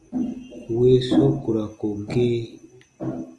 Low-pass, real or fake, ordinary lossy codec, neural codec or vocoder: 10.8 kHz; real; Opus, 24 kbps; none